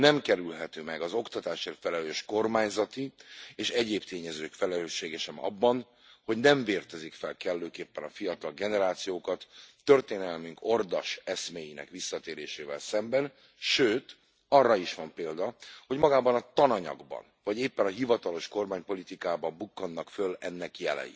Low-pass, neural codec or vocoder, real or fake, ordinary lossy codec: none; none; real; none